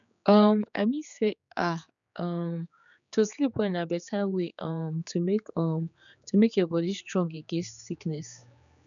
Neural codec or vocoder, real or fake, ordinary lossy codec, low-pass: codec, 16 kHz, 4 kbps, X-Codec, HuBERT features, trained on general audio; fake; none; 7.2 kHz